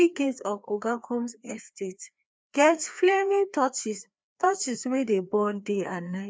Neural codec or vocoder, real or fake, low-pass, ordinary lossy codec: codec, 16 kHz, 2 kbps, FreqCodec, larger model; fake; none; none